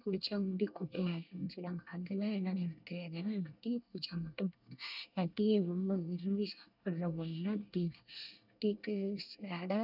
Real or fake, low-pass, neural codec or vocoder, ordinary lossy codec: fake; 5.4 kHz; codec, 24 kHz, 1 kbps, SNAC; none